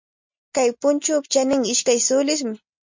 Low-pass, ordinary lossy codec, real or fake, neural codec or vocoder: 7.2 kHz; MP3, 48 kbps; real; none